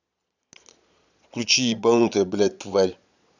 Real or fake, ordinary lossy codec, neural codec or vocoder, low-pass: fake; none; vocoder, 22.05 kHz, 80 mel bands, Vocos; 7.2 kHz